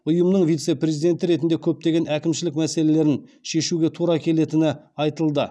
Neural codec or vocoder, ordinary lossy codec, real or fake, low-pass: none; none; real; none